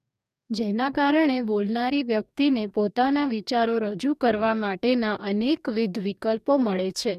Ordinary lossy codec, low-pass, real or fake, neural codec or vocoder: none; 14.4 kHz; fake; codec, 44.1 kHz, 2.6 kbps, DAC